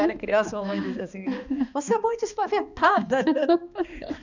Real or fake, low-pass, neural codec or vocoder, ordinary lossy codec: fake; 7.2 kHz; codec, 16 kHz, 2 kbps, X-Codec, HuBERT features, trained on balanced general audio; none